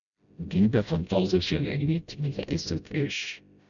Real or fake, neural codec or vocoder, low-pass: fake; codec, 16 kHz, 0.5 kbps, FreqCodec, smaller model; 7.2 kHz